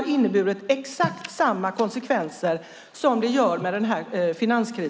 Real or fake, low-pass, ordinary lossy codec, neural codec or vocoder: real; none; none; none